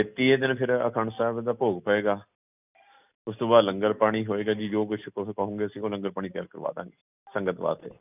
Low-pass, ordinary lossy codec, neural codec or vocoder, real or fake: 3.6 kHz; none; none; real